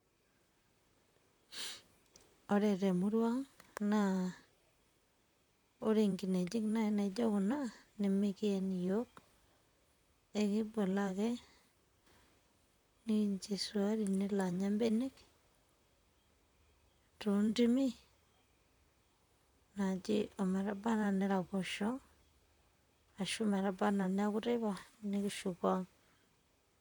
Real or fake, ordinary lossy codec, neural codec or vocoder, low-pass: fake; none; vocoder, 44.1 kHz, 128 mel bands, Pupu-Vocoder; 19.8 kHz